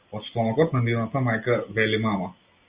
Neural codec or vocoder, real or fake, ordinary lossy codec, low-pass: none; real; Opus, 64 kbps; 3.6 kHz